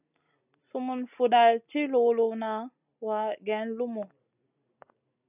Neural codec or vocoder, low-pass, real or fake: none; 3.6 kHz; real